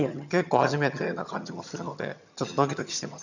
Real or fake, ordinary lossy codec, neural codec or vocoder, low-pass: fake; none; vocoder, 22.05 kHz, 80 mel bands, HiFi-GAN; 7.2 kHz